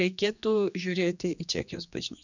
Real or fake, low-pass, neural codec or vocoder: fake; 7.2 kHz; codec, 16 kHz, 2 kbps, X-Codec, HuBERT features, trained on general audio